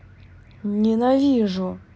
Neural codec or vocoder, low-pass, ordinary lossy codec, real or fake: none; none; none; real